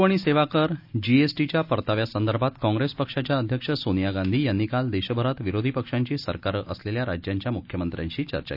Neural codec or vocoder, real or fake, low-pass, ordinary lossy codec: none; real; 5.4 kHz; none